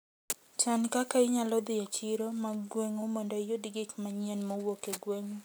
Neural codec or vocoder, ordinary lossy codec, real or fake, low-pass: none; none; real; none